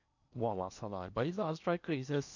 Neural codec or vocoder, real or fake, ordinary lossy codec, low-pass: codec, 16 kHz in and 24 kHz out, 0.8 kbps, FocalCodec, streaming, 65536 codes; fake; none; 7.2 kHz